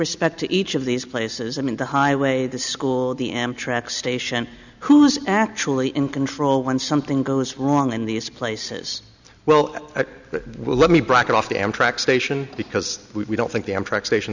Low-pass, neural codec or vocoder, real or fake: 7.2 kHz; none; real